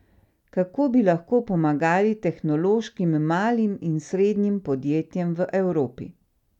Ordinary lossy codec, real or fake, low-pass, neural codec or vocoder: none; real; 19.8 kHz; none